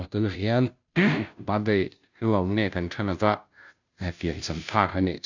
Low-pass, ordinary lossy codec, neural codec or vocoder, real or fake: 7.2 kHz; none; codec, 16 kHz, 0.5 kbps, FunCodec, trained on Chinese and English, 25 frames a second; fake